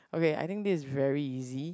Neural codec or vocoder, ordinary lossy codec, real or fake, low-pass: none; none; real; none